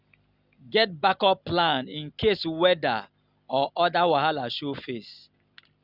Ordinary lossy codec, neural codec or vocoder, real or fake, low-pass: Opus, 64 kbps; none; real; 5.4 kHz